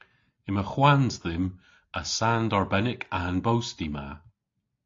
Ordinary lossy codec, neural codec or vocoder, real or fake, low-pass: AAC, 48 kbps; none; real; 7.2 kHz